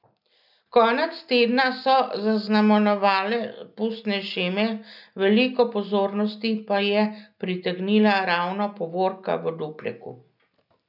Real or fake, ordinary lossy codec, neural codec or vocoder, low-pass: real; none; none; 5.4 kHz